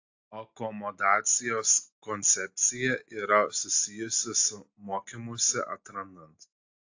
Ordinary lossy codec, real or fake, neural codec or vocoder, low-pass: AAC, 48 kbps; real; none; 7.2 kHz